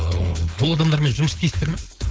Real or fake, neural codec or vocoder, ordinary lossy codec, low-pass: fake; codec, 16 kHz, 4.8 kbps, FACodec; none; none